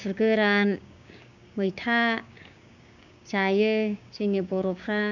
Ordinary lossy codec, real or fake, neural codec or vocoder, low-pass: none; fake; codec, 16 kHz, 6 kbps, DAC; 7.2 kHz